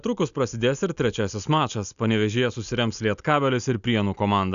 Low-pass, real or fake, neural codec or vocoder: 7.2 kHz; real; none